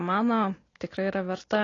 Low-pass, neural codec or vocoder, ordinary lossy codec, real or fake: 7.2 kHz; none; AAC, 32 kbps; real